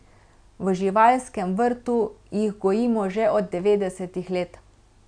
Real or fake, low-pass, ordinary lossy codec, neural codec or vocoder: real; 9.9 kHz; none; none